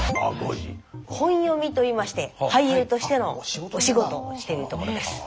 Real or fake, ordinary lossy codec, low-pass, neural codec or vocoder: real; none; none; none